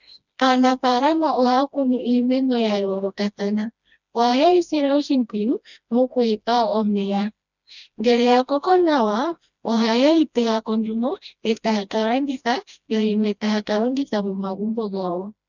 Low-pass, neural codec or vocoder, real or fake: 7.2 kHz; codec, 16 kHz, 1 kbps, FreqCodec, smaller model; fake